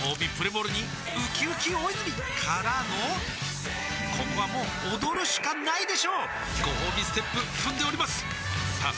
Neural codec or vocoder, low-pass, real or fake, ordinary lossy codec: none; none; real; none